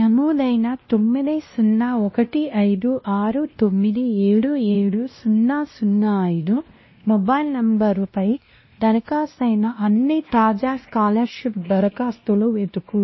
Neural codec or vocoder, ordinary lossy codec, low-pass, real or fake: codec, 16 kHz, 1 kbps, X-Codec, WavLM features, trained on Multilingual LibriSpeech; MP3, 24 kbps; 7.2 kHz; fake